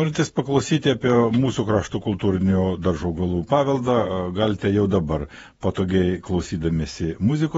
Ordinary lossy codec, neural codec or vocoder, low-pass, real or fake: AAC, 24 kbps; vocoder, 48 kHz, 128 mel bands, Vocos; 19.8 kHz; fake